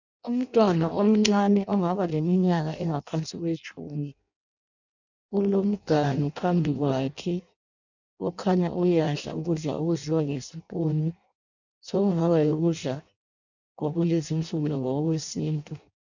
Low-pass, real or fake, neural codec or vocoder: 7.2 kHz; fake; codec, 16 kHz in and 24 kHz out, 0.6 kbps, FireRedTTS-2 codec